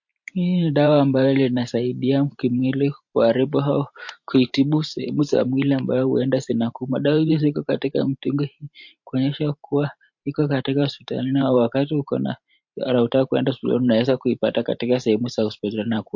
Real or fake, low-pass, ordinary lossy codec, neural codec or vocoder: fake; 7.2 kHz; MP3, 48 kbps; vocoder, 44.1 kHz, 128 mel bands every 512 samples, BigVGAN v2